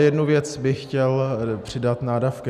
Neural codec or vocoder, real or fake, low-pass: none; real; 14.4 kHz